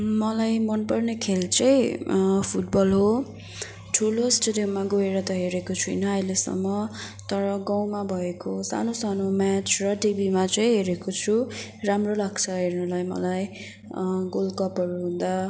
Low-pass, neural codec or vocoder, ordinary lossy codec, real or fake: none; none; none; real